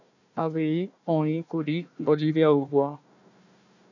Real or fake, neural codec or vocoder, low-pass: fake; codec, 16 kHz, 1 kbps, FunCodec, trained on Chinese and English, 50 frames a second; 7.2 kHz